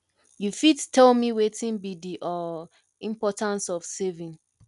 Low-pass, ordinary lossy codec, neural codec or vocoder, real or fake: 10.8 kHz; none; none; real